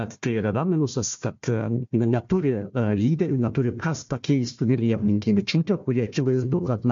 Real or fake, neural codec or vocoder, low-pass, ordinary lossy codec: fake; codec, 16 kHz, 1 kbps, FunCodec, trained on Chinese and English, 50 frames a second; 7.2 kHz; MP3, 48 kbps